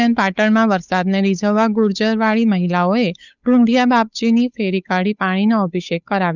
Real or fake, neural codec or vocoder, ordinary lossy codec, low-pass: fake; codec, 16 kHz, 8 kbps, FunCodec, trained on Chinese and English, 25 frames a second; none; 7.2 kHz